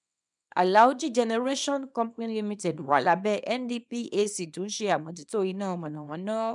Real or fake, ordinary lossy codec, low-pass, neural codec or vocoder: fake; none; 10.8 kHz; codec, 24 kHz, 0.9 kbps, WavTokenizer, small release